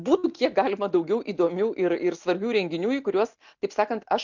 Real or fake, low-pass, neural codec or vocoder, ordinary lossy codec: real; 7.2 kHz; none; MP3, 64 kbps